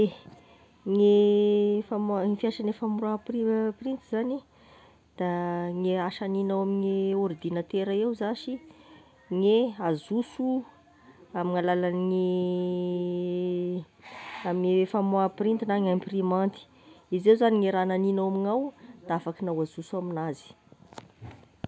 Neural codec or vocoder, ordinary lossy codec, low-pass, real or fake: none; none; none; real